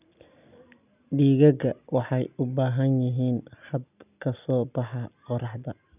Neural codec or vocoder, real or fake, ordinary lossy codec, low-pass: none; real; none; 3.6 kHz